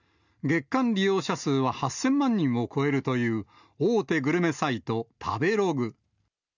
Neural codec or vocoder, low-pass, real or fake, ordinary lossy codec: none; 7.2 kHz; real; none